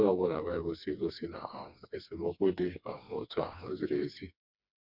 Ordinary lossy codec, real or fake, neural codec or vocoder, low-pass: MP3, 48 kbps; fake; codec, 16 kHz, 2 kbps, FreqCodec, smaller model; 5.4 kHz